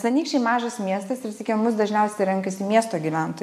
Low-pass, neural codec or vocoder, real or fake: 14.4 kHz; none; real